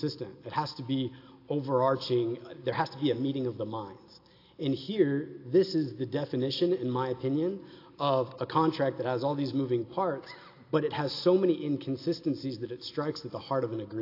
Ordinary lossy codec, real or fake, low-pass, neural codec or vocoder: AAC, 32 kbps; real; 5.4 kHz; none